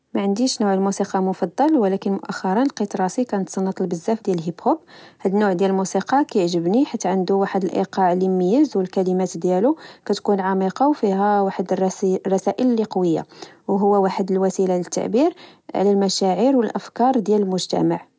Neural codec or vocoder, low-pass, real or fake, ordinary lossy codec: none; none; real; none